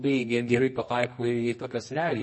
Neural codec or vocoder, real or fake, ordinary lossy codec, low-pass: codec, 24 kHz, 0.9 kbps, WavTokenizer, medium music audio release; fake; MP3, 32 kbps; 10.8 kHz